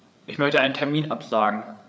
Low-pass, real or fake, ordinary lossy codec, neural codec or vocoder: none; fake; none; codec, 16 kHz, 8 kbps, FreqCodec, larger model